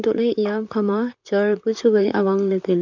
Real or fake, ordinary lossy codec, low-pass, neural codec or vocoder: fake; none; 7.2 kHz; vocoder, 44.1 kHz, 128 mel bands, Pupu-Vocoder